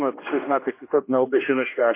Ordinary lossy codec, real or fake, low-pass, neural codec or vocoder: MP3, 24 kbps; fake; 3.6 kHz; codec, 16 kHz, 1 kbps, X-Codec, HuBERT features, trained on general audio